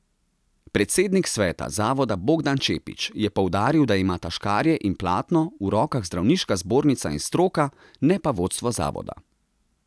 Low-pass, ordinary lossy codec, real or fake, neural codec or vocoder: none; none; real; none